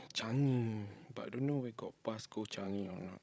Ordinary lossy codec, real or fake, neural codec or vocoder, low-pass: none; fake; codec, 16 kHz, 16 kbps, FreqCodec, larger model; none